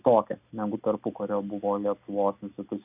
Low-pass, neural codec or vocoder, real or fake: 3.6 kHz; none; real